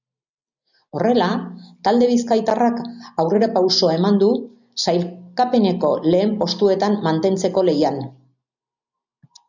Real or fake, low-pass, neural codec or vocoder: real; 7.2 kHz; none